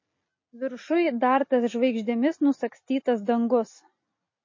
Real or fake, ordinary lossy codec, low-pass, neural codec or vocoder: real; MP3, 32 kbps; 7.2 kHz; none